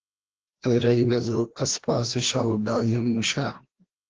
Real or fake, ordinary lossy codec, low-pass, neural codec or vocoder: fake; Opus, 16 kbps; 7.2 kHz; codec, 16 kHz, 1 kbps, FreqCodec, larger model